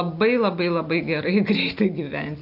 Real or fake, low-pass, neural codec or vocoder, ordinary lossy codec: real; 5.4 kHz; none; AAC, 48 kbps